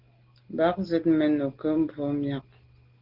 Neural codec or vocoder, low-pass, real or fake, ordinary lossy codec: none; 5.4 kHz; real; Opus, 16 kbps